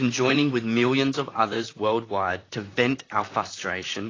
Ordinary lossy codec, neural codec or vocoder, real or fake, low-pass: AAC, 32 kbps; vocoder, 44.1 kHz, 128 mel bands, Pupu-Vocoder; fake; 7.2 kHz